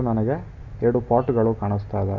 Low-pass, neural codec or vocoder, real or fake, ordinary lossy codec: 7.2 kHz; none; real; none